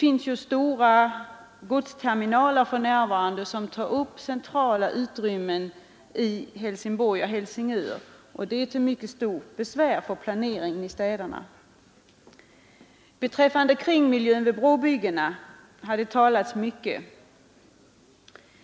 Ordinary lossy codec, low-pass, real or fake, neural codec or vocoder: none; none; real; none